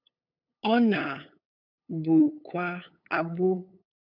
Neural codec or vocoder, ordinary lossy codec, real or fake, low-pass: codec, 16 kHz, 8 kbps, FunCodec, trained on LibriTTS, 25 frames a second; AAC, 48 kbps; fake; 5.4 kHz